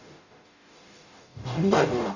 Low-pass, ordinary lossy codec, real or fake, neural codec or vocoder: 7.2 kHz; none; fake; codec, 44.1 kHz, 0.9 kbps, DAC